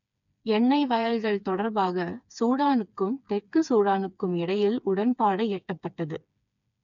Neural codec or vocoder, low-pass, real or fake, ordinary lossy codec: codec, 16 kHz, 4 kbps, FreqCodec, smaller model; 7.2 kHz; fake; none